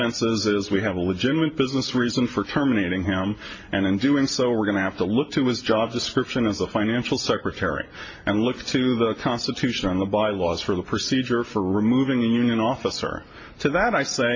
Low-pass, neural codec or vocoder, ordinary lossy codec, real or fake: 7.2 kHz; none; MP3, 32 kbps; real